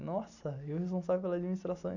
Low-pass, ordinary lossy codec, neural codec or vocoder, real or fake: 7.2 kHz; none; none; real